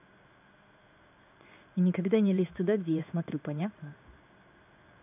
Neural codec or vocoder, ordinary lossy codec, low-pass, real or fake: codec, 16 kHz in and 24 kHz out, 1 kbps, XY-Tokenizer; none; 3.6 kHz; fake